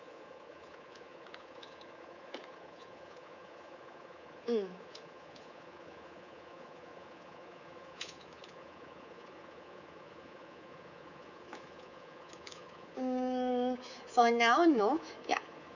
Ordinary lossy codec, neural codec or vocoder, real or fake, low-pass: none; codec, 24 kHz, 3.1 kbps, DualCodec; fake; 7.2 kHz